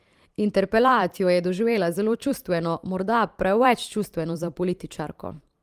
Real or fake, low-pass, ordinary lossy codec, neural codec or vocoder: fake; 14.4 kHz; Opus, 24 kbps; vocoder, 44.1 kHz, 128 mel bands every 512 samples, BigVGAN v2